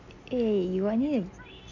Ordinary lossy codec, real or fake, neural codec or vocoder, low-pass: none; real; none; 7.2 kHz